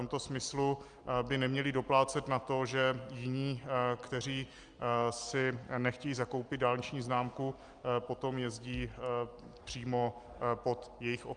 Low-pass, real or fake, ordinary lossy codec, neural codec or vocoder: 9.9 kHz; real; Opus, 32 kbps; none